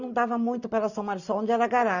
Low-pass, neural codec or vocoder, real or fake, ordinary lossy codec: 7.2 kHz; none; real; none